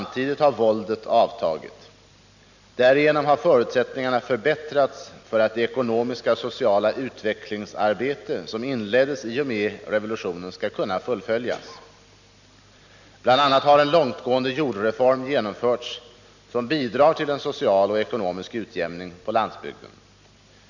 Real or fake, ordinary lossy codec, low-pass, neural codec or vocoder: real; none; 7.2 kHz; none